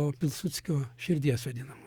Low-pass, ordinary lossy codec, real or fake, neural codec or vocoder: 19.8 kHz; Opus, 64 kbps; fake; codec, 44.1 kHz, 7.8 kbps, DAC